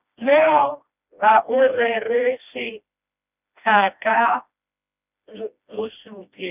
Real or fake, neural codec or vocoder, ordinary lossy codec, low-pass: fake; codec, 16 kHz, 1 kbps, FreqCodec, smaller model; none; 3.6 kHz